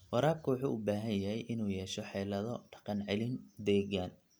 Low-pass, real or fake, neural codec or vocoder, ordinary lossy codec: none; real; none; none